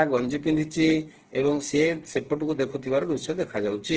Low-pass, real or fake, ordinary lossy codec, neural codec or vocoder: 7.2 kHz; fake; Opus, 16 kbps; codec, 16 kHz, 4 kbps, FreqCodec, smaller model